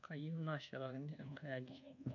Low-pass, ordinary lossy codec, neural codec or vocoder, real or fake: 7.2 kHz; none; codec, 24 kHz, 1.2 kbps, DualCodec; fake